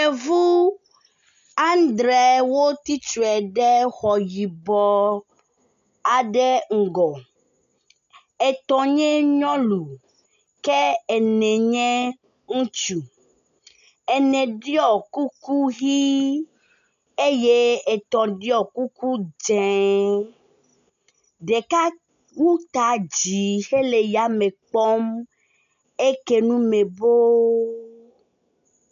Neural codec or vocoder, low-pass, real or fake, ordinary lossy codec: none; 7.2 kHz; real; AAC, 96 kbps